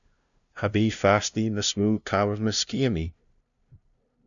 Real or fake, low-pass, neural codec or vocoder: fake; 7.2 kHz; codec, 16 kHz, 0.5 kbps, FunCodec, trained on LibriTTS, 25 frames a second